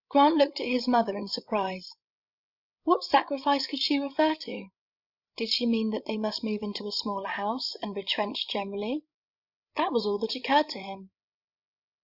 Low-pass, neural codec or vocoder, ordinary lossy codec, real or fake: 5.4 kHz; codec, 16 kHz, 16 kbps, FreqCodec, larger model; Opus, 64 kbps; fake